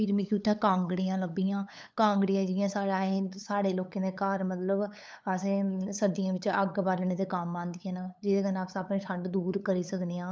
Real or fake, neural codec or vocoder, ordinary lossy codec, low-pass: fake; codec, 16 kHz, 8 kbps, FunCodec, trained on LibriTTS, 25 frames a second; none; none